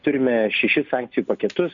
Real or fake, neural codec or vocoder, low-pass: real; none; 7.2 kHz